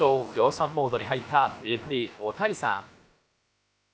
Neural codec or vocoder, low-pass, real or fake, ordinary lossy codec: codec, 16 kHz, about 1 kbps, DyCAST, with the encoder's durations; none; fake; none